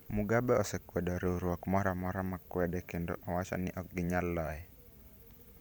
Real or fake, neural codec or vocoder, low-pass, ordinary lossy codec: fake; vocoder, 44.1 kHz, 128 mel bands every 512 samples, BigVGAN v2; none; none